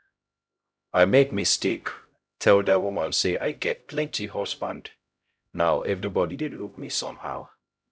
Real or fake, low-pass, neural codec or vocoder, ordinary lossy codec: fake; none; codec, 16 kHz, 0.5 kbps, X-Codec, HuBERT features, trained on LibriSpeech; none